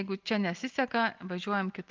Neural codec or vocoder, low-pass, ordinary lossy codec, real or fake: none; 7.2 kHz; Opus, 32 kbps; real